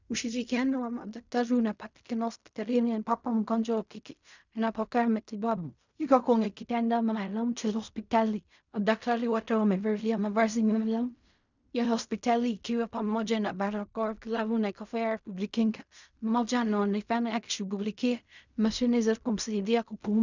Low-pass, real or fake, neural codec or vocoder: 7.2 kHz; fake; codec, 16 kHz in and 24 kHz out, 0.4 kbps, LongCat-Audio-Codec, fine tuned four codebook decoder